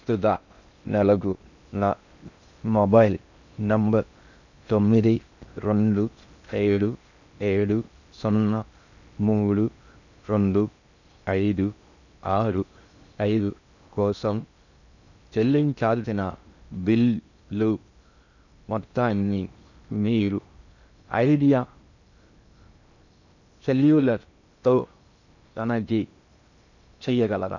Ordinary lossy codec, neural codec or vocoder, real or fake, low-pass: none; codec, 16 kHz in and 24 kHz out, 0.6 kbps, FocalCodec, streaming, 4096 codes; fake; 7.2 kHz